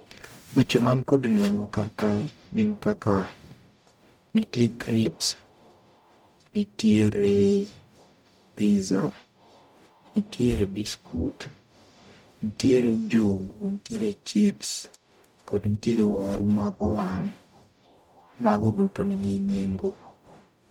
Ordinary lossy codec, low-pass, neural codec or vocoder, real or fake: MP3, 96 kbps; 19.8 kHz; codec, 44.1 kHz, 0.9 kbps, DAC; fake